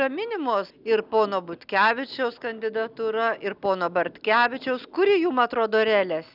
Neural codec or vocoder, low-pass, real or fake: none; 5.4 kHz; real